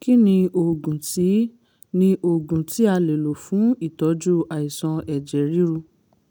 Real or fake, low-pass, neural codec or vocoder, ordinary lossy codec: real; none; none; none